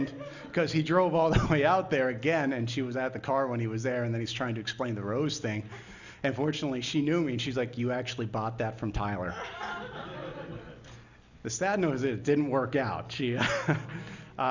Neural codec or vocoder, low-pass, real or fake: none; 7.2 kHz; real